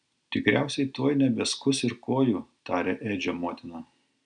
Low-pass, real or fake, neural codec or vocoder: 9.9 kHz; real; none